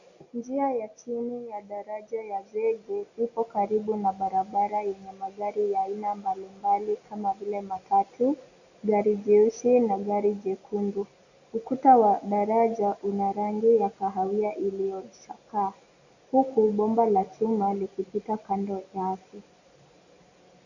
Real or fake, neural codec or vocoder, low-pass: real; none; 7.2 kHz